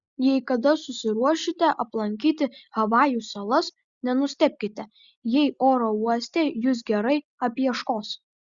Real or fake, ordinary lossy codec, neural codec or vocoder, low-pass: real; Opus, 64 kbps; none; 7.2 kHz